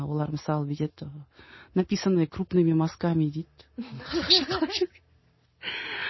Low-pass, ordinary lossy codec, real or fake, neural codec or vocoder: 7.2 kHz; MP3, 24 kbps; real; none